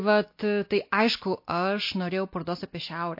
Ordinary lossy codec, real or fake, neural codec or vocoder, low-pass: MP3, 32 kbps; real; none; 5.4 kHz